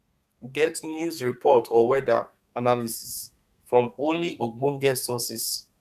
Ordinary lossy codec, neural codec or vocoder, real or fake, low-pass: none; codec, 32 kHz, 1.9 kbps, SNAC; fake; 14.4 kHz